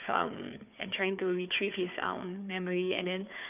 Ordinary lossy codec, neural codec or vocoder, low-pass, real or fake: none; codec, 16 kHz, 4 kbps, FunCodec, trained on Chinese and English, 50 frames a second; 3.6 kHz; fake